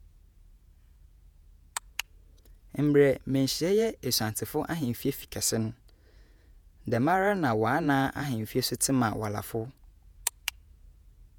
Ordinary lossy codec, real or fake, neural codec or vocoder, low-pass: none; fake; vocoder, 48 kHz, 128 mel bands, Vocos; none